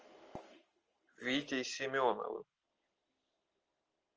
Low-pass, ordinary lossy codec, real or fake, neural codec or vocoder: 7.2 kHz; Opus, 24 kbps; real; none